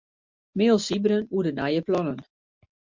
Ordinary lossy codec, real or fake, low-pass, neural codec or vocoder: MP3, 64 kbps; real; 7.2 kHz; none